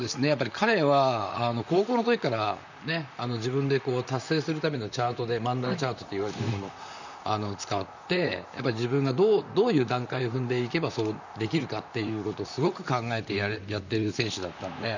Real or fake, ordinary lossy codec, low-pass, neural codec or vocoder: fake; none; 7.2 kHz; vocoder, 44.1 kHz, 128 mel bands, Pupu-Vocoder